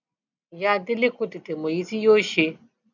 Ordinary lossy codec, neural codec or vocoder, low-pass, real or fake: none; none; 7.2 kHz; real